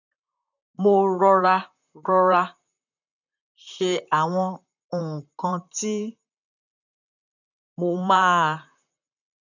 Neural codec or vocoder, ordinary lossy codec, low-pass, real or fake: vocoder, 44.1 kHz, 128 mel bands, Pupu-Vocoder; none; 7.2 kHz; fake